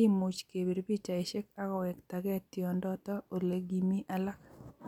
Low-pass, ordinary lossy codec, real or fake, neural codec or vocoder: 19.8 kHz; none; real; none